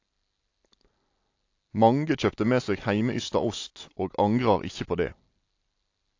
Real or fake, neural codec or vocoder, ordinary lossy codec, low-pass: real; none; AAC, 48 kbps; 7.2 kHz